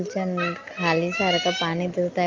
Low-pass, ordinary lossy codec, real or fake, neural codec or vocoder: 7.2 kHz; Opus, 24 kbps; real; none